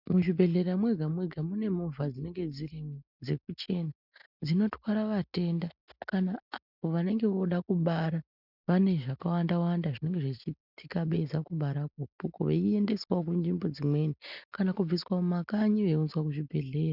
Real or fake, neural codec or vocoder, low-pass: real; none; 5.4 kHz